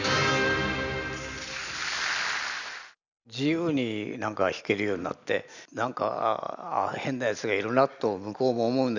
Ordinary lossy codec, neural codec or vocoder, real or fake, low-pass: none; none; real; 7.2 kHz